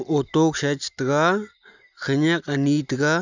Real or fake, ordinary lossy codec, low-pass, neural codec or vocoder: real; none; 7.2 kHz; none